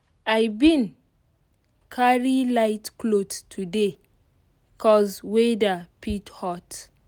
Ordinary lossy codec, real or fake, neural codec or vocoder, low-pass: none; real; none; none